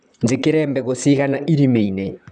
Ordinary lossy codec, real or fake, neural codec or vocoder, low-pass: none; fake; vocoder, 44.1 kHz, 128 mel bands, Pupu-Vocoder; 10.8 kHz